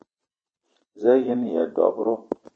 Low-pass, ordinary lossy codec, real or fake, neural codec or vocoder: 9.9 kHz; MP3, 32 kbps; fake; vocoder, 22.05 kHz, 80 mel bands, Vocos